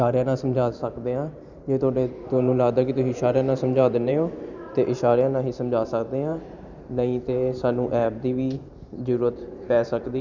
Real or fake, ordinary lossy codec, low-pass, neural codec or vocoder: fake; none; 7.2 kHz; vocoder, 44.1 kHz, 128 mel bands every 512 samples, BigVGAN v2